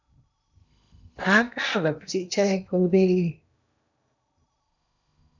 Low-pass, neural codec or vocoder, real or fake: 7.2 kHz; codec, 16 kHz in and 24 kHz out, 0.8 kbps, FocalCodec, streaming, 65536 codes; fake